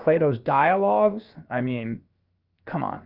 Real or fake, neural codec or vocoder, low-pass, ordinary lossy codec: fake; codec, 16 kHz, about 1 kbps, DyCAST, with the encoder's durations; 5.4 kHz; Opus, 32 kbps